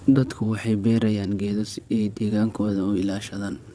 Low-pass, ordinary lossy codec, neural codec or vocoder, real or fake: none; none; vocoder, 22.05 kHz, 80 mel bands, WaveNeXt; fake